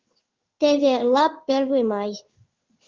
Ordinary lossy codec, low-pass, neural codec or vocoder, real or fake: Opus, 24 kbps; 7.2 kHz; codec, 16 kHz in and 24 kHz out, 1 kbps, XY-Tokenizer; fake